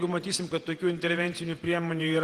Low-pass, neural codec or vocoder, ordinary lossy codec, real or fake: 14.4 kHz; vocoder, 48 kHz, 128 mel bands, Vocos; Opus, 24 kbps; fake